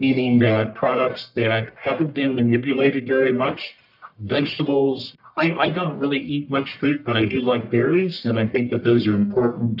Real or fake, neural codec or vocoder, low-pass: fake; codec, 44.1 kHz, 1.7 kbps, Pupu-Codec; 5.4 kHz